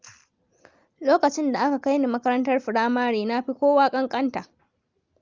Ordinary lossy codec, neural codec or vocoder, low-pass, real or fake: Opus, 32 kbps; none; 7.2 kHz; real